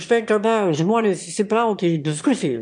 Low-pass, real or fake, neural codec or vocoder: 9.9 kHz; fake; autoencoder, 22.05 kHz, a latent of 192 numbers a frame, VITS, trained on one speaker